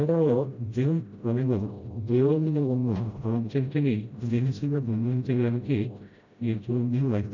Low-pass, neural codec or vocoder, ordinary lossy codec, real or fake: 7.2 kHz; codec, 16 kHz, 0.5 kbps, FreqCodec, smaller model; none; fake